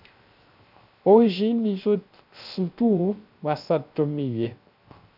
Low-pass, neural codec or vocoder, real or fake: 5.4 kHz; codec, 16 kHz, 0.3 kbps, FocalCodec; fake